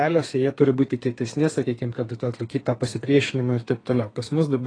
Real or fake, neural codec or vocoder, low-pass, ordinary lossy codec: fake; codec, 32 kHz, 1.9 kbps, SNAC; 9.9 kHz; AAC, 32 kbps